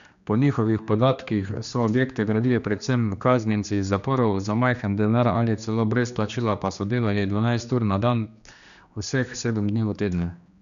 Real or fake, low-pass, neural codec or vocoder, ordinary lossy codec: fake; 7.2 kHz; codec, 16 kHz, 2 kbps, X-Codec, HuBERT features, trained on general audio; none